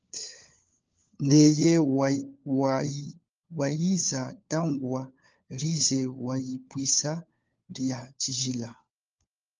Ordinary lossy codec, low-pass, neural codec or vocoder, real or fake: Opus, 24 kbps; 7.2 kHz; codec, 16 kHz, 4 kbps, FunCodec, trained on LibriTTS, 50 frames a second; fake